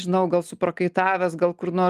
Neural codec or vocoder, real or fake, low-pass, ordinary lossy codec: none; real; 14.4 kHz; Opus, 32 kbps